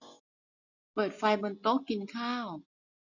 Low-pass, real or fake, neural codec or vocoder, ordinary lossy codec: 7.2 kHz; real; none; none